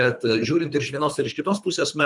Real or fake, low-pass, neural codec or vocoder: fake; 10.8 kHz; codec, 24 kHz, 3 kbps, HILCodec